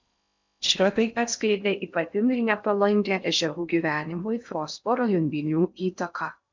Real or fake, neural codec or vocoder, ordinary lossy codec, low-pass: fake; codec, 16 kHz in and 24 kHz out, 0.6 kbps, FocalCodec, streaming, 4096 codes; MP3, 64 kbps; 7.2 kHz